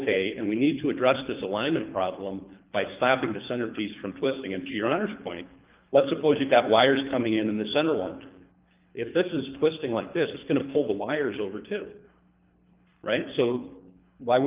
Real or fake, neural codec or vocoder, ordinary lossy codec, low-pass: fake; codec, 24 kHz, 3 kbps, HILCodec; Opus, 32 kbps; 3.6 kHz